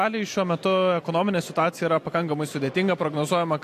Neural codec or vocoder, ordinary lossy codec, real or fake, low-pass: none; AAC, 64 kbps; real; 14.4 kHz